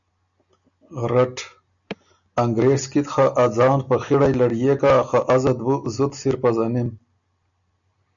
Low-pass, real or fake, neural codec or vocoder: 7.2 kHz; real; none